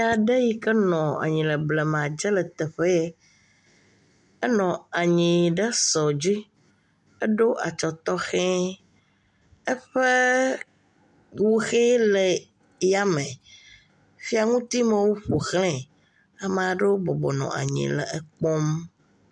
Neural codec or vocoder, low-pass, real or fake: none; 10.8 kHz; real